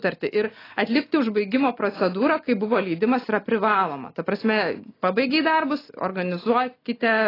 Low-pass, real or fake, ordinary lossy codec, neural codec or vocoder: 5.4 kHz; real; AAC, 24 kbps; none